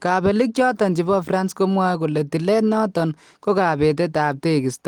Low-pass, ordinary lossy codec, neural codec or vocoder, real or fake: 19.8 kHz; Opus, 24 kbps; none; real